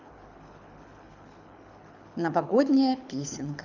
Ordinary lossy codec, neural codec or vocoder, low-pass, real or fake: none; codec, 24 kHz, 6 kbps, HILCodec; 7.2 kHz; fake